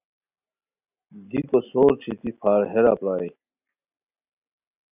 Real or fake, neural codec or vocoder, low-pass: real; none; 3.6 kHz